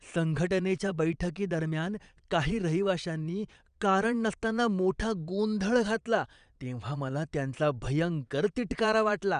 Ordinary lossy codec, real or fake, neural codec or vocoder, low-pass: none; fake; vocoder, 22.05 kHz, 80 mel bands, Vocos; 9.9 kHz